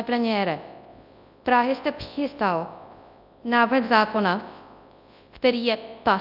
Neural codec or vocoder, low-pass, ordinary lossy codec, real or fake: codec, 24 kHz, 0.9 kbps, WavTokenizer, large speech release; 5.4 kHz; AAC, 48 kbps; fake